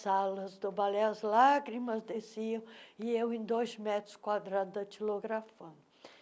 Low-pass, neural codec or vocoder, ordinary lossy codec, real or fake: none; none; none; real